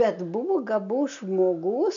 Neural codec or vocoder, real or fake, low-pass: none; real; 7.2 kHz